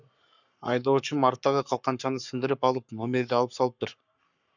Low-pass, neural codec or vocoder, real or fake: 7.2 kHz; codec, 44.1 kHz, 7.8 kbps, Pupu-Codec; fake